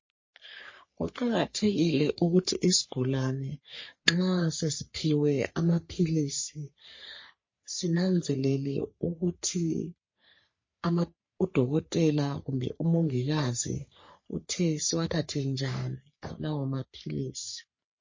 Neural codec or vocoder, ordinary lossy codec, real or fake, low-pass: codec, 44.1 kHz, 3.4 kbps, Pupu-Codec; MP3, 32 kbps; fake; 7.2 kHz